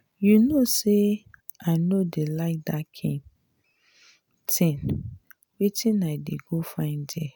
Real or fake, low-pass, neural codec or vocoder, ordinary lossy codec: real; none; none; none